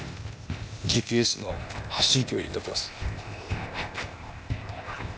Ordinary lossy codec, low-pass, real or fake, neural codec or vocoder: none; none; fake; codec, 16 kHz, 0.8 kbps, ZipCodec